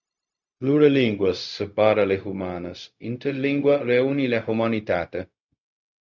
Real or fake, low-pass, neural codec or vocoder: fake; 7.2 kHz; codec, 16 kHz, 0.4 kbps, LongCat-Audio-Codec